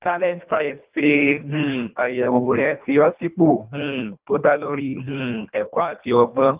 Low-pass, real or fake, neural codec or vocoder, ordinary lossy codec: 3.6 kHz; fake; codec, 24 kHz, 1.5 kbps, HILCodec; Opus, 24 kbps